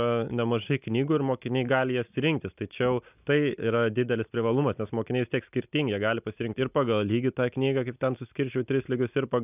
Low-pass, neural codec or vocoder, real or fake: 3.6 kHz; none; real